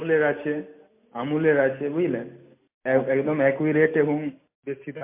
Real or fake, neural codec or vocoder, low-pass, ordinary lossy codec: real; none; 3.6 kHz; MP3, 24 kbps